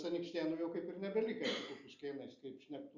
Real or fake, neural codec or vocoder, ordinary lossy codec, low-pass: real; none; Opus, 64 kbps; 7.2 kHz